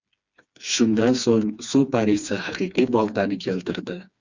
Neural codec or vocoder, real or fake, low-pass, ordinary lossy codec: codec, 16 kHz, 2 kbps, FreqCodec, smaller model; fake; 7.2 kHz; Opus, 64 kbps